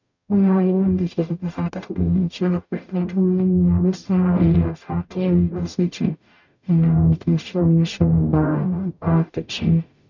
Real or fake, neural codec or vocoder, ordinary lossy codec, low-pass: fake; codec, 44.1 kHz, 0.9 kbps, DAC; none; 7.2 kHz